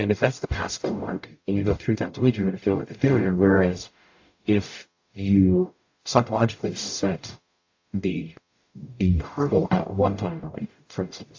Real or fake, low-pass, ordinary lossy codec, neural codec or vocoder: fake; 7.2 kHz; AAC, 48 kbps; codec, 44.1 kHz, 0.9 kbps, DAC